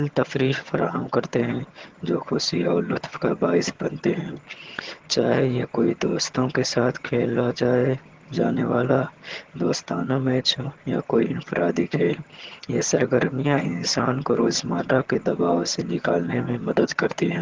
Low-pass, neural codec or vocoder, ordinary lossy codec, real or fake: 7.2 kHz; vocoder, 22.05 kHz, 80 mel bands, HiFi-GAN; Opus, 16 kbps; fake